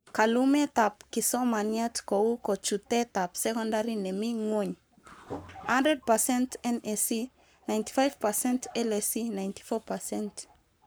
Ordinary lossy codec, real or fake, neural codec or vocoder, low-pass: none; fake; codec, 44.1 kHz, 7.8 kbps, Pupu-Codec; none